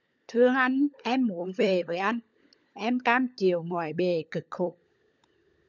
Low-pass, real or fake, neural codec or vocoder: 7.2 kHz; fake; codec, 16 kHz, 16 kbps, FunCodec, trained on LibriTTS, 50 frames a second